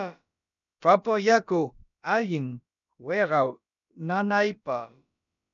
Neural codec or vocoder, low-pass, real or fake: codec, 16 kHz, about 1 kbps, DyCAST, with the encoder's durations; 7.2 kHz; fake